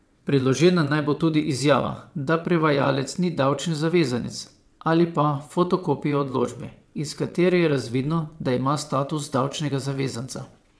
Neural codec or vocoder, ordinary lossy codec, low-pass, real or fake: vocoder, 22.05 kHz, 80 mel bands, WaveNeXt; none; none; fake